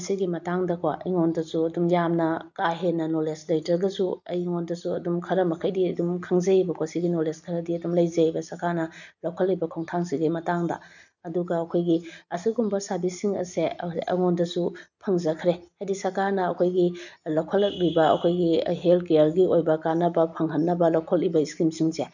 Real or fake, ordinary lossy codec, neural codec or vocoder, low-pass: real; none; none; 7.2 kHz